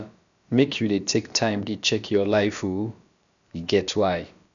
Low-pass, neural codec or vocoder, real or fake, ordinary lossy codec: 7.2 kHz; codec, 16 kHz, about 1 kbps, DyCAST, with the encoder's durations; fake; none